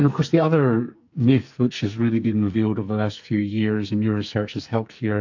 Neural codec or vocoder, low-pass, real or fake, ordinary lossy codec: codec, 44.1 kHz, 2.6 kbps, SNAC; 7.2 kHz; fake; AAC, 48 kbps